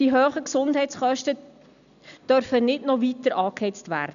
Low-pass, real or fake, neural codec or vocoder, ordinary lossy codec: 7.2 kHz; real; none; none